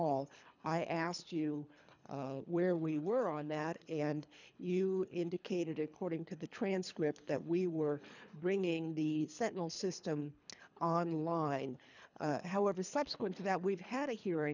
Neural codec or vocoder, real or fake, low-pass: codec, 24 kHz, 3 kbps, HILCodec; fake; 7.2 kHz